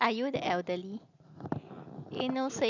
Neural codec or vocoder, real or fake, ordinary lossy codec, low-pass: none; real; none; 7.2 kHz